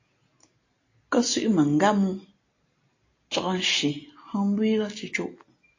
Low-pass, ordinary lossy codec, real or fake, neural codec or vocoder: 7.2 kHz; AAC, 32 kbps; real; none